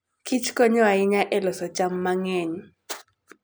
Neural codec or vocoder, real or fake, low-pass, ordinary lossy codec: none; real; none; none